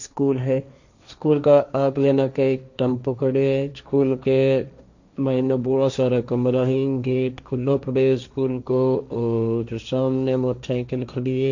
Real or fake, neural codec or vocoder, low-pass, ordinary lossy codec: fake; codec, 16 kHz, 1.1 kbps, Voila-Tokenizer; 7.2 kHz; none